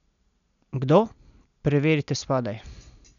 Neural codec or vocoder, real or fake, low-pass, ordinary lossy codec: none; real; 7.2 kHz; none